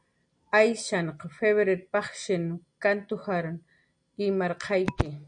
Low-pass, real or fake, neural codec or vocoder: 9.9 kHz; real; none